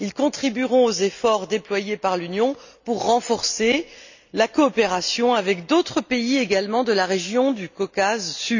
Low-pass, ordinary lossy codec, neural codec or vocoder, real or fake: 7.2 kHz; none; none; real